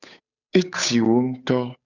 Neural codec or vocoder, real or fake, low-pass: codec, 16 kHz, 8 kbps, FunCodec, trained on Chinese and English, 25 frames a second; fake; 7.2 kHz